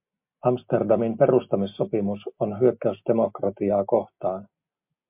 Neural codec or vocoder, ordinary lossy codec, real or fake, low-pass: none; MP3, 32 kbps; real; 3.6 kHz